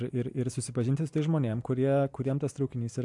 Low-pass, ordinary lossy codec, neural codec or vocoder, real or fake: 10.8 kHz; MP3, 64 kbps; none; real